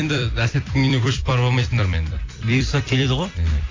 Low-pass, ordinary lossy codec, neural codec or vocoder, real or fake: 7.2 kHz; AAC, 32 kbps; vocoder, 44.1 kHz, 128 mel bands every 256 samples, BigVGAN v2; fake